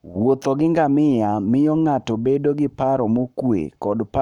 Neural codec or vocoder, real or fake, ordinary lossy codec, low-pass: codec, 44.1 kHz, 7.8 kbps, Pupu-Codec; fake; none; 19.8 kHz